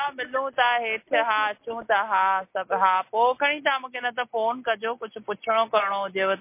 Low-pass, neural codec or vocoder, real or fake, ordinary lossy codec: 3.6 kHz; none; real; MP3, 24 kbps